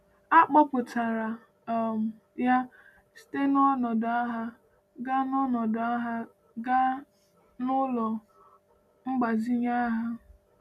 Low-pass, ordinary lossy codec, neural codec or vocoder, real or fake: 14.4 kHz; none; none; real